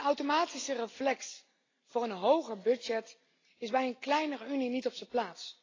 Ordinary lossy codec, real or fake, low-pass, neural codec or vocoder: AAC, 32 kbps; real; 7.2 kHz; none